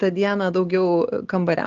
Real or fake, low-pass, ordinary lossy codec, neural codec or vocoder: real; 7.2 kHz; Opus, 16 kbps; none